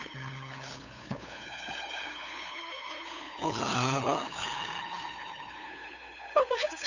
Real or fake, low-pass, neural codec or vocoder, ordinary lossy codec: fake; 7.2 kHz; codec, 16 kHz, 8 kbps, FunCodec, trained on LibriTTS, 25 frames a second; none